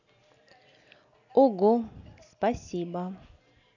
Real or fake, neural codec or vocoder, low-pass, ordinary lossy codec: real; none; 7.2 kHz; none